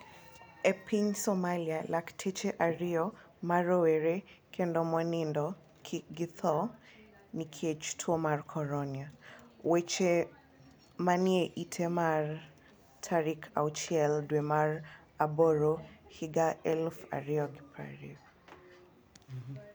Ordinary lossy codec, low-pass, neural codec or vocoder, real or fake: none; none; none; real